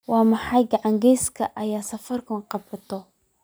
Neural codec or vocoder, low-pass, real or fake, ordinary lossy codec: none; none; real; none